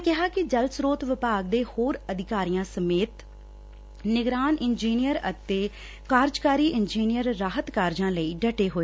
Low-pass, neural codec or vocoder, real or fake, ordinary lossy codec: none; none; real; none